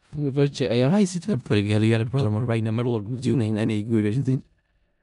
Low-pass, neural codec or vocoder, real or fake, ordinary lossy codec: 10.8 kHz; codec, 16 kHz in and 24 kHz out, 0.4 kbps, LongCat-Audio-Codec, four codebook decoder; fake; none